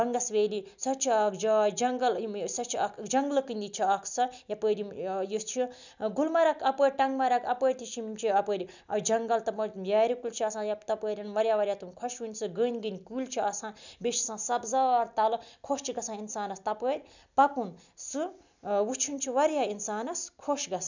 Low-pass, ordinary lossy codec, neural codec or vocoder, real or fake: 7.2 kHz; none; none; real